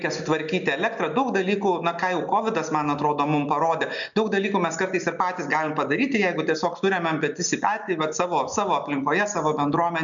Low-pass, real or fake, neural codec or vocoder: 7.2 kHz; real; none